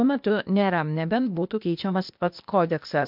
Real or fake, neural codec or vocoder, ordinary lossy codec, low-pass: fake; codec, 16 kHz, 0.8 kbps, ZipCodec; MP3, 48 kbps; 5.4 kHz